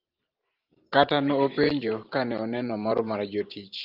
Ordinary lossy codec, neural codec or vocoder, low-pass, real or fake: Opus, 24 kbps; vocoder, 44.1 kHz, 128 mel bands every 512 samples, BigVGAN v2; 5.4 kHz; fake